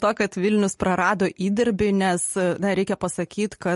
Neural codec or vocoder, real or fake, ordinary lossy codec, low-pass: none; real; MP3, 48 kbps; 14.4 kHz